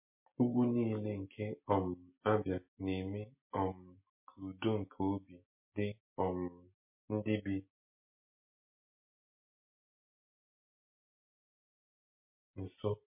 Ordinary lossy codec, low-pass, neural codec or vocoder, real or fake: MP3, 24 kbps; 3.6 kHz; none; real